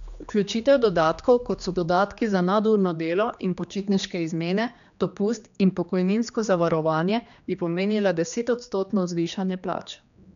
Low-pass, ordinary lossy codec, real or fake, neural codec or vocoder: 7.2 kHz; none; fake; codec, 16 kHz, 2 kbps, X-Codec, HuBERT features, trained on general audio